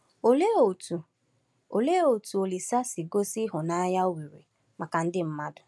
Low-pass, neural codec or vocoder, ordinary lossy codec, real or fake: none; none; none; real